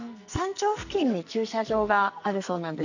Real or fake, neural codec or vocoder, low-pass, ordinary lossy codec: fake; codec, 44.1 kHz, 2.6 kbps, SNAC; 7.2 kHz; none